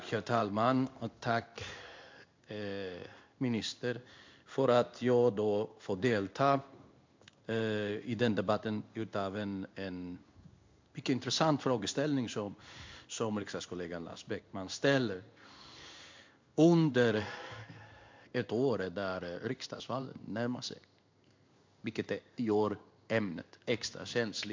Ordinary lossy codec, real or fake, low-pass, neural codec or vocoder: MP3, 64 kbps; fake; 7.2 kHz; codec, 16 kHz in and 24 kHz out, 1 kbps, XY-Tokenizer